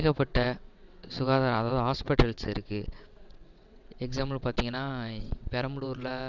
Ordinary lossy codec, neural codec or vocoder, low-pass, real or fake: none; vocoder, 22.05 kHz, 80 mel bands, WaveNeXt; 7.2 kHz; fake